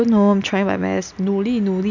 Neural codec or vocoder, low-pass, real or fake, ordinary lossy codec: none; 7.2 kHz; real; none